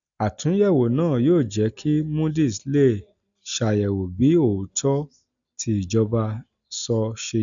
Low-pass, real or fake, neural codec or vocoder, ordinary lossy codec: 7.2 kHz; real; none; none